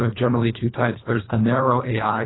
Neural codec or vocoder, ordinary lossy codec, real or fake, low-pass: codec, 24 kHz, 1.5 kbps, HILCodec; AAC, 16 kbps; fake; 7.2 kHz